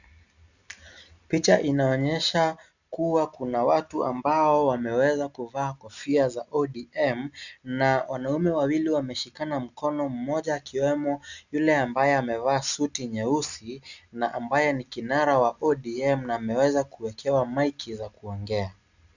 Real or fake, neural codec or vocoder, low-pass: real; none; 7.2 kHz